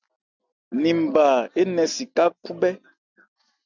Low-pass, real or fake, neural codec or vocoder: 7.2 kHz; real; none